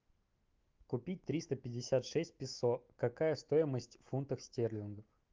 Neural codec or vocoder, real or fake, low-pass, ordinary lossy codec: autoencoder, 48 kHz, 128 numbers a frame, DAC-VAE, trained on Japanese speech; fake; 7.2 kHz; Opus, 24 kbps